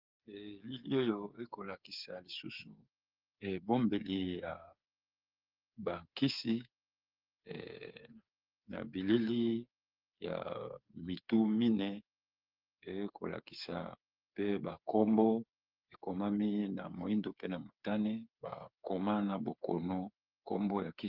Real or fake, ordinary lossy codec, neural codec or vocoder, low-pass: fake; Opus, 32 kbps; codec, 16 kHz, 8 kbps, FreqCodec, smaller model; 5.4 kHz